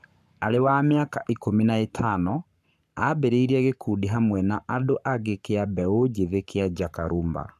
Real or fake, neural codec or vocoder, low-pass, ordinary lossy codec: fake; codec, 44.1 kHz, 7.8 kbps, Pupu-Codec; 14.4 kHz; none